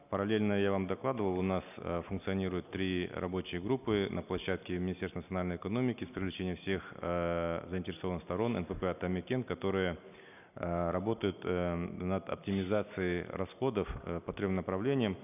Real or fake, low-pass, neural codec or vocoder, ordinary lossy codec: real; 3.6 kHz; none; none